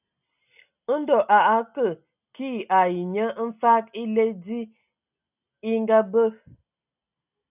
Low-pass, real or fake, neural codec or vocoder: 3.6 kHz; real; none